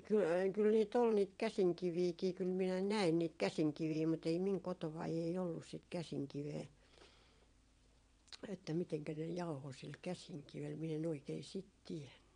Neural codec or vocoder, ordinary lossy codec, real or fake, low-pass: vocoder, 22.05 kHz, 80 mel bands, WaveNeXt; MP3, 64 kbps; fake; 9.9 kHz